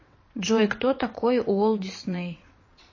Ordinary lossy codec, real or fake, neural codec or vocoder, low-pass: MP3, 32 kbps; fake; vocoder, 22.05 kHz, 80 mel bands, WaveNeXt; 7.2 kHz